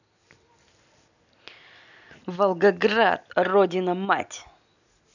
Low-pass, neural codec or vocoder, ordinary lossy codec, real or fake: 7.2 kHz; none; none; real